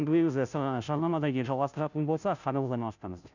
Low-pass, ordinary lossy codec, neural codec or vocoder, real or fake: 7.2 kHz; none; codec, 16 kHz, 0.5 kbps, FunCodec, trained on Chinese and English, 25 frames a second; fake